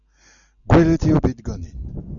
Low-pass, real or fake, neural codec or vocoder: 7.2 kHz; real; none